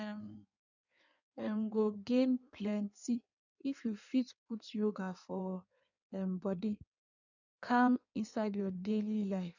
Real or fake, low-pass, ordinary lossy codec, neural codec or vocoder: fake; 7.2 kHz; none; codec, 16 kHz in and 24 kHz out, 1.1 kbps, FireRedTTS-2 codec